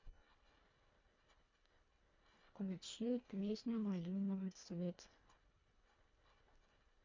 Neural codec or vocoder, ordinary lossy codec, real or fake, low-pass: codec, 24 kHz, 1.5 kbps, HILCodec; MP3, 48 kbps; fake; 7.2 kHz